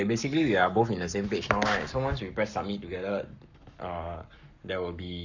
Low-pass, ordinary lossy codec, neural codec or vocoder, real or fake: 7.2 kHz; none; codec, 44.1 kHz, 7.8 kbps, Pupu-Codec; fake